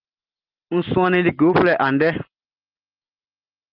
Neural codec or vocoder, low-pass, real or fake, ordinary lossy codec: none; 5.4 kHz; real; Opus, 24 kbps